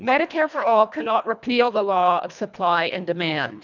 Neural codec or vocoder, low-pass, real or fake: codec, 24 kHz, 1.5 kbps, HILCodec; 7.2 kHz; fake